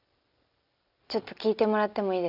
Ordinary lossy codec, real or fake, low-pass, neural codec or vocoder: none; real; 5.4 kHz; none